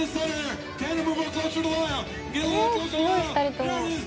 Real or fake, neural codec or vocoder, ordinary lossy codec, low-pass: real; none; none; none